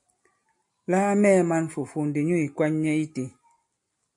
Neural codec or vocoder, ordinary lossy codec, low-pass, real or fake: none; MP3, 48 kbps; 10.8 kHz; real